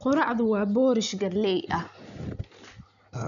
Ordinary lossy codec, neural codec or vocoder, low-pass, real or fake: none; none; 7.2 kHz; real